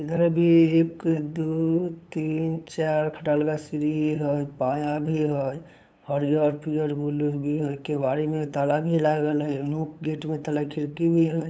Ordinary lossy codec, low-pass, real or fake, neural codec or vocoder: none; none; fake; codec, 16 kHz, 8 kbps, FunCodec, trained on LibriTTS, 25 frames a second